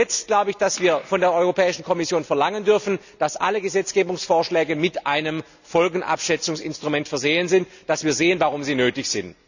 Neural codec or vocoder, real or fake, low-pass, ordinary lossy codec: none; real; 7.2 kHz; none